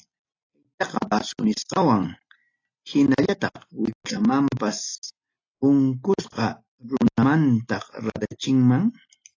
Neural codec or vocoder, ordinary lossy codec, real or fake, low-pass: none; AAC, 32 kbps; real; 7.2 kHz